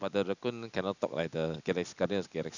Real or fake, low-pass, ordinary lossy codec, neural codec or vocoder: real; 7.2 kHz; none; none